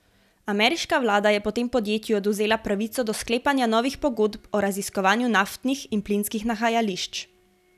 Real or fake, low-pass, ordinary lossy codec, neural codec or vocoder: real; 14.4 kHz; none; none